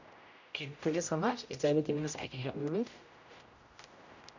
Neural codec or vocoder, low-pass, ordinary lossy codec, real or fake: codec, 16 kHz, 0.5 kbps, X-Codec, HuBERT features, trained on general audio; 7.2 kHz; none; fake